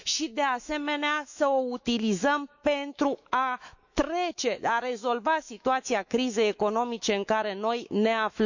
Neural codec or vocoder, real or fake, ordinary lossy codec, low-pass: autoencoder, 48 kHz, 128 numbers a frame, DAC-VAE, trained on Japanese speech; fake; none; 7.2 kHz